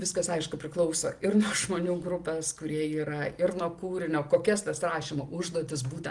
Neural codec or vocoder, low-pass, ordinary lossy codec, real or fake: none; 10.8 kHz; Opus, 24 kbps; real